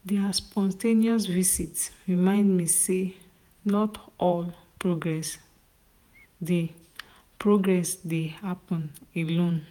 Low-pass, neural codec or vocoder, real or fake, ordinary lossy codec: 19.8 kHz; vocoder, 48 kHz, 128 mel bands, Vocos; fake; none